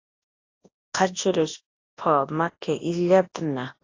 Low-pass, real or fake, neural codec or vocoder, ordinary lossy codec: 7.2 kHz; fake; codec, 24 kHz, 0.9 kbps, WavTokenizer, large speech release; AAC, 32 kbps